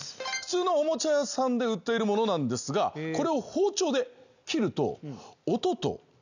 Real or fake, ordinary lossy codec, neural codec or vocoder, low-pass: real; none; none; 7.2 kHz